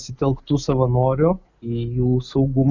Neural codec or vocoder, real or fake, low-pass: none; real; 7.2 kHz